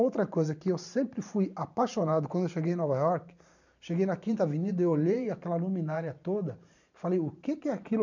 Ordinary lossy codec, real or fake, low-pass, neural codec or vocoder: none; fake; 7.2 kHz; vocoder, 44.1 kHz, 128 mel bands every 512 samples, BigVGAN v2